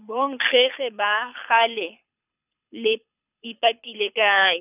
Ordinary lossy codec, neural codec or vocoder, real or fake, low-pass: none; codec, 24 kHz, 6 kbps, HILCodec; fake; 3.6 kHz